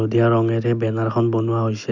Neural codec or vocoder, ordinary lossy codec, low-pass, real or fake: none; none; 7.2 kHz; real